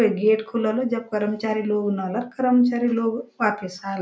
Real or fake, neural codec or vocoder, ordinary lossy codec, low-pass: real; none; none; none